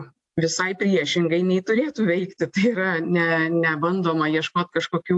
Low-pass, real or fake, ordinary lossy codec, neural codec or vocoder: 10.8 kHz; real; AAC, 64 kbps; none